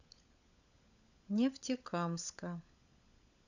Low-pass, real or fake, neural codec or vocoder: 7.2 kHz; fake; codec, 16 kHz, 16 kbps, FunCodec, trained on LibriTTS, 50 frames a second